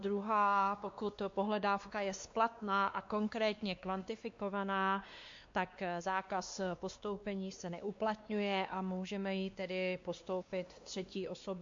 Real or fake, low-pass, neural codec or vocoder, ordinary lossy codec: fake; 7.2 kHz; codec, 16 kHz, 2 kbps, X-Codec, WavLM features, trained on Multilingual LibriSpeech; MP3, 48 kbps